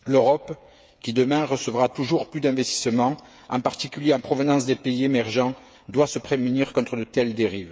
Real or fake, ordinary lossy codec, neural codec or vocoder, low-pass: fake; none; codec, 16 kHz, 8 kbps, FreqCodec, smaller model; none